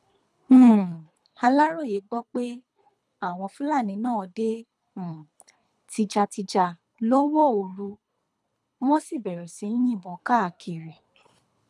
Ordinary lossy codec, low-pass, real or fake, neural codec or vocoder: none; none; fake; codec, 24 kHz, 3 kbps, HILCodec